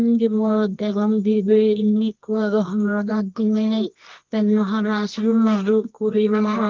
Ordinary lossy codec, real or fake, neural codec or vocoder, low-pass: Opus, 24 kbps; fake; codec, 24 kHz, 0.9 kbps, WavTokenizer, medium music audio release; 7.2 kHz